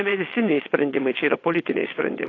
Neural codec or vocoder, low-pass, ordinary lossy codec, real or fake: vocoder, 22.05 kHz, 80 mel bands, WaveNeXt; 7.2 kHz; AAC, 32 kbps; fake